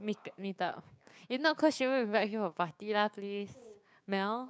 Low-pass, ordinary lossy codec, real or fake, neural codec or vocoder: none; none; fake; codec, 16 kHz, 6 kbps, DAC